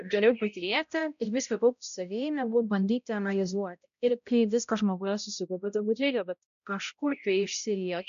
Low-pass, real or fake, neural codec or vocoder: 7.2 kHz; fake; codec, 16 kHz, 0.5 kbps, X-Codec, HuBERT features, trained on balanced general audio